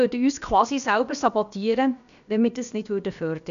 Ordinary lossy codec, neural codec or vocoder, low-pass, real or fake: none; codec, 16 kHz, about 1 kbps, DyCAST, with the encoder's durations; 7.2 kHz; fake